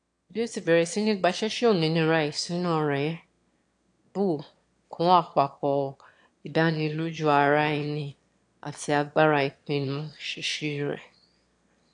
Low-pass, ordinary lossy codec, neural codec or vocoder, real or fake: 9.9 kHz; AAC, 64 kbps; autoencoder, 22.05 kHz, a latent of 192 numbers a frame, VITS, trained on one speaker; fake